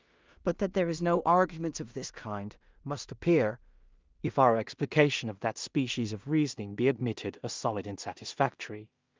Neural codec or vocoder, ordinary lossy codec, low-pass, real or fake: codec, 16 kHz in and 24 kHz out, 0.4 kbps, LongCat-Audio-Codec, two codebook decoder; Opus, 24 kbps; 7.2 kHz; fake